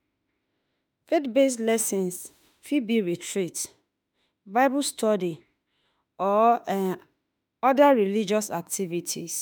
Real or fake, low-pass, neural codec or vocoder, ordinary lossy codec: fake; none; autoencoder, 48 kHz, 32 numbers a frame, DAC-VAE, trained on Japanese speech; none